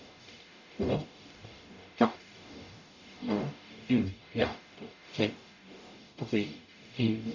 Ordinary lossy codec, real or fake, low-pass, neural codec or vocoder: none; fake; 7.2 kHz; codec, 44.1 kHz, 0.9 kbps, DAC